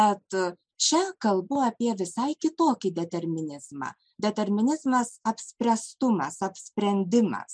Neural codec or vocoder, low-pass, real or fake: none; 9.9 kHz; real